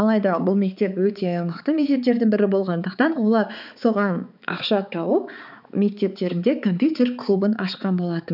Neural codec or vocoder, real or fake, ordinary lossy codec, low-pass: codec, 16 kHz, 4 kbps, X-Codec, HuBERT features, trained on balanced general audio; fake; none; 5.4 kHz